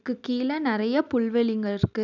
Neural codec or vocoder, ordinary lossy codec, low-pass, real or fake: none; none; 7.2 kHz; real